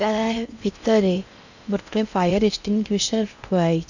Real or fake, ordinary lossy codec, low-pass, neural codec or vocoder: fake; none; 7.2 kHz; codec, 16 kHz in and 24 kHz out, 0.6 kbps, FocalCodec, streaming, 4096 codes